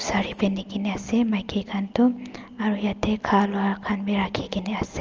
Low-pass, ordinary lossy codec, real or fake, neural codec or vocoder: 7.2 kHz; Opus, 24 kbps; real; none